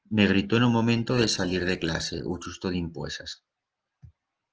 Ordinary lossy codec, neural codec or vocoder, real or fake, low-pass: Opus, 16 kbps; none; real; 7.2 kHz